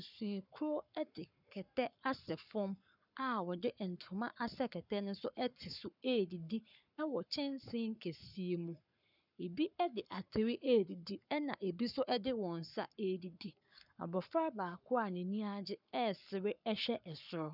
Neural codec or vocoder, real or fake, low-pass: codec, 44.1 kHz, 7.8 kbps, Pupu-Codec; fake; 5.4 kHz